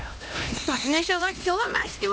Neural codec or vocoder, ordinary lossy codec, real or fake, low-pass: codec, 16 kHz, 1 kbps, X-Codec, HuBERT features, trained on LibriSpeech; none; fake; none